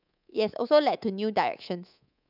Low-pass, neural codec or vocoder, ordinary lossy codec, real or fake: 5.4 kHz; codec, 24 kHz, 3.1 kbps, DualCodec; none; fake